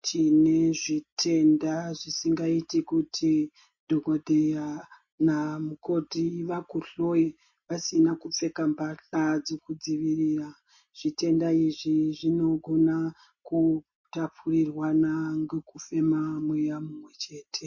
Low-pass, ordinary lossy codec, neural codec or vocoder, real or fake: 7.2 kHz; MP3, 32 kbps; none; real